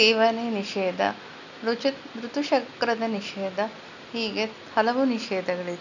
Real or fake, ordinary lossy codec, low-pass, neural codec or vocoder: real; none; 7.2 kHz; none